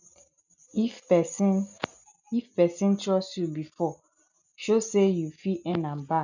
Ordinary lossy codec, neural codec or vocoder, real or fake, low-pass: none; none; real; 7.2 kHz